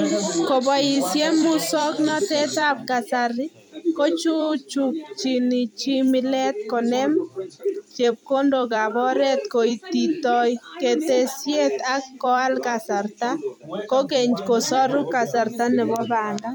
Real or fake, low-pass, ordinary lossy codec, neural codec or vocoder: fake; none; none; vocoder, 44.1 kHz, 128 mel bands every 256 samples, BigVGAN v2